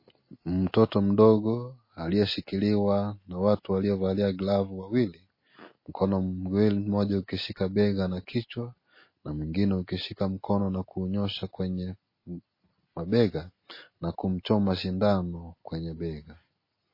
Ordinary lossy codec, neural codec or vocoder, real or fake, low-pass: MP3, 24 kbps; none; real; 5.4 kHz